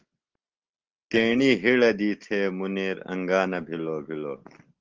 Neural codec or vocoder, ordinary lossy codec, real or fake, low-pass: none; Opus, 16 kbps; real; 7.2 kHz